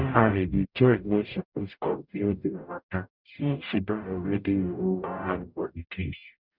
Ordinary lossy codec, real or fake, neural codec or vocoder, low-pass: none; fake; codec, 44.1 kHz, 0.9 kbps, DAC; 5.4 kHz